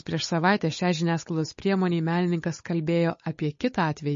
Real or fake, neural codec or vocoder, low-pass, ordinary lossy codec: fake; codec, 16 kHz, 8 kbps, FunCodec, trained on Chinese and English, 25 frames a second; 7.2 kHz; MP3, 32 kbps